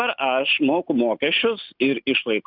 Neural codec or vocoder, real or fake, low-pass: none; real; 5.4 kHz